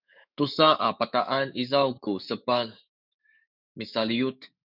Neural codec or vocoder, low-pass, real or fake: autoencoder, 48 kHz, 128 numbers a frame, DAC-VAE, trained on Japanese speech; 5.4 kHz; fake